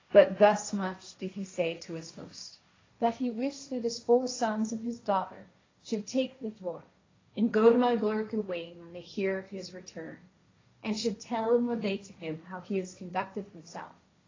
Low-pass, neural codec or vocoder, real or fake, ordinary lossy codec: 7.2 kHz; codec, 16 kHz, 1.1 kbps, Voila-Tokenizer; fake; AAC, 32 kbps